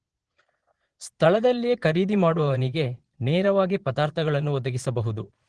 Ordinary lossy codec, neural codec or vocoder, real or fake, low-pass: Opus, 16 kbps; vocoder, 22.05 kHz, 80 mel bands, Vocos; fake; 9.9 kHz